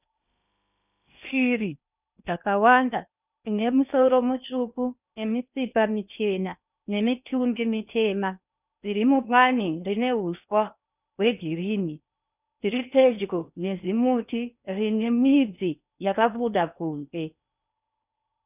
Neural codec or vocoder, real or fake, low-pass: codec, 16 kHz in and 24 kHz out, 0.8 kbps, FocalCodec, streaming, 65536 codes; fake; 3.6 kHz